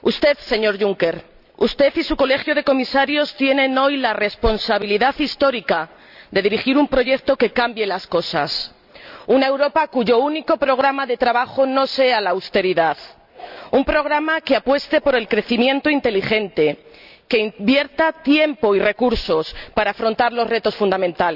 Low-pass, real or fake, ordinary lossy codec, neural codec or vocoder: 5.4 kHz; real; none; none